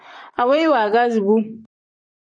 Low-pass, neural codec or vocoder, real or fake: 9.9 kHz; vocoder, 44.1 kHz, 128 mel bands, Pupu-Vocoder; fake